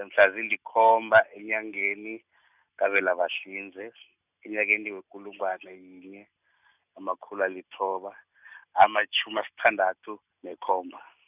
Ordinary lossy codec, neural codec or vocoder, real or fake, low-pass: none; none; real; 3.6 kHz